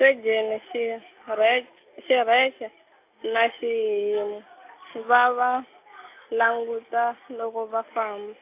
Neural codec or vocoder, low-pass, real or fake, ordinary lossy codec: none; 3.6 kHz; real; AAC, 24 kbps